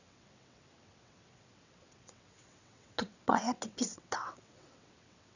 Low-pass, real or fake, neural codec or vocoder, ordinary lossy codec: 7.2 kHz; fake; vocoder, 22.05 kHz, 80 mel bands, WaveNeXt; none